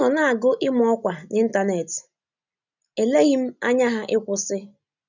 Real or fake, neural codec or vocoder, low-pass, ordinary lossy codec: real; none; 7.2 kHz; none